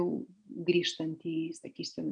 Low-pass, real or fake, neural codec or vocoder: 9.9 kHz; real; none